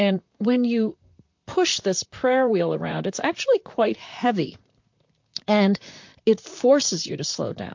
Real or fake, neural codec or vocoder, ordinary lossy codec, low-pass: fake; vocoder, 44.1 kHz, 128 mel bands, Pupu-Vocoder; MP3, 48 kbps; 7.2 kHz